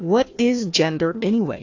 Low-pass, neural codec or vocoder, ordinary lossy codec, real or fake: 7.2 kHz; codec, 16 kHz, 1 kbps, FunCodec, trained on Chinese and English, 50 frames a second; AAC, 48 kbps; fake